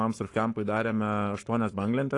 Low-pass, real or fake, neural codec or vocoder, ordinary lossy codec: 10.8 kHz; fake; codec, 44.1 kHz, 7.8 kbps, Pupu-Codec; AAC, 48 kbps